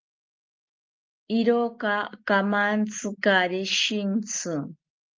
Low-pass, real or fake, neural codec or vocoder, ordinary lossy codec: 7.2 kHz; real; none; Opus, 32 kbps